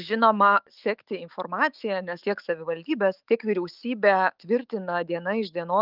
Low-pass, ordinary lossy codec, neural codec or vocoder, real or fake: 5.4 kHz; Opus, 24 kbps; autoencoder, 48 kHz, 128 numbers a frame, DAC-VAE, trained on Japanese speech; fake